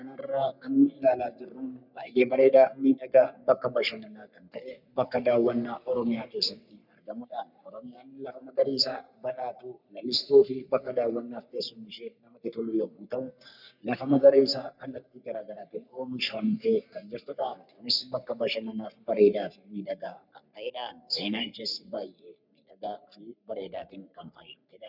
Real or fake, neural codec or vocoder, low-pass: fake; codec, 44.1 kHz, 3.4 kbps, Pupu-Codec; 5.4 kHz